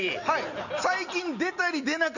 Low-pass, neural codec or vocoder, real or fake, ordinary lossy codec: 7.2 kHz; none; real; none